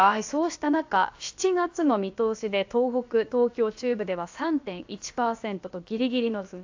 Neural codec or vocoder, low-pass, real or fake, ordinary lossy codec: codec, 16 kHz, about 1 kbps, DyCAST, with the encoder's durations; 7.2 kHz; fake; AAC, 48 kbps